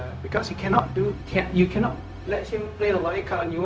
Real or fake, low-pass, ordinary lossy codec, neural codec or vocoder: fake; none; none; codec, 16 kHz, 0.4 kbps, LongCat-Audio-Codec